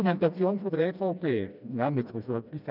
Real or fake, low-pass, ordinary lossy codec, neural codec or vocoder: fake; 5.4 kHz; none; codec, 16 kHz, 1 kbps, FreqCodec, smaller model